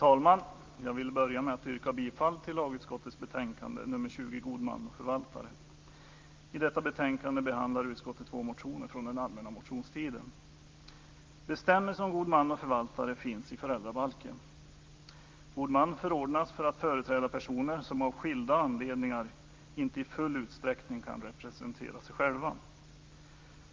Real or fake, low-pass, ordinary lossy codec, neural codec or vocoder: real; 7.2 kHz; Opus, 24 kbps; none